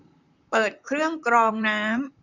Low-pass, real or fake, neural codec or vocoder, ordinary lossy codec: 7.2 kHz; fake; vocoder, 44.1 kHz, 128 mel bands, Pupu-Vocoder; none